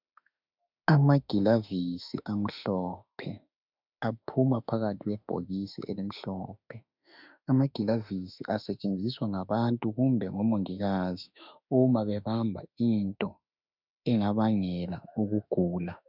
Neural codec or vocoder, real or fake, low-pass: autoencoder, 48 kHz, 32 numbers a frame, DAC-VAE, trained on Japanese speech; fake; 5.4 kHz